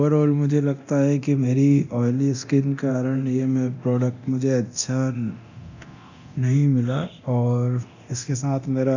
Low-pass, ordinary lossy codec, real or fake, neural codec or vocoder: 7.2 kHz; none; fake; codec, 24 kHz, 0.9 kbps, DualCodec